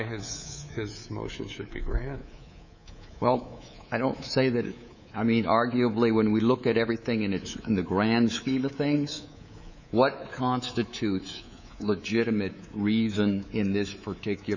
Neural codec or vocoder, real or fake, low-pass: codec, 24 kHz, 3.1 kbps, DualCodec; fake; 7.2 kHz